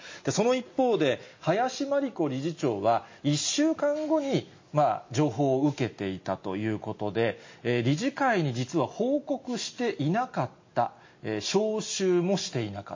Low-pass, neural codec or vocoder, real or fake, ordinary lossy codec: 7.2 kHz; none; real; MP3, 32 kbps